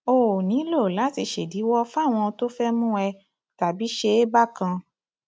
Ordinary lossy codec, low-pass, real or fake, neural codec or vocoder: none; none; real; none